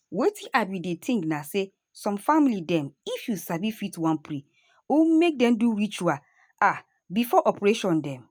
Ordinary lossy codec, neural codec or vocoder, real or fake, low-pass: none; none; real; none